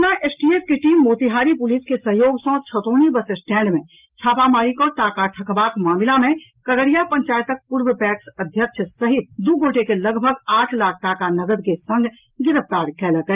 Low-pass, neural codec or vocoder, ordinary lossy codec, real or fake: 3.6 kHz; none; Opus, 32 kbps; real